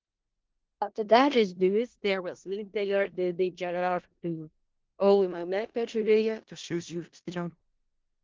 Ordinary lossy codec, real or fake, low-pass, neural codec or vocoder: Opus, 16 kbps; fake; 7.2 kHz; codec, 16 kHz in and 24 kHz out, 0.4 kbps, LongCat-Audio-Codec, four codebook decoder